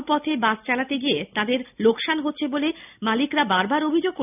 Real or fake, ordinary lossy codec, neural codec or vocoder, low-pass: real; none; none; 3.6 kHz